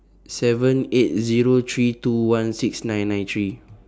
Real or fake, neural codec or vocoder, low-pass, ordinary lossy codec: real; none; none; none